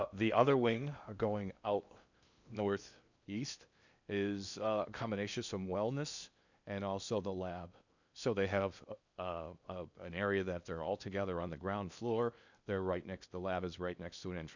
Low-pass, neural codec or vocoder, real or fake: 7.2 kHz; codec, 16 kHz in and 24 kHz out, 0.8 kbps, FocalCodec, streaming, 65536 codes; fake